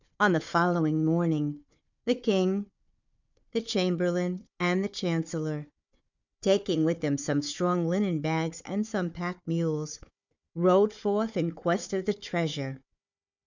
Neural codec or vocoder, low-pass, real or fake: codec, 16 kHz, 4 kbps, FunCodec, trained on Chinese and English, 50 frames a second; 7.2 kHz; fake